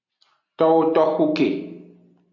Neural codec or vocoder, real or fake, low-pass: none; real; 7.2 kHz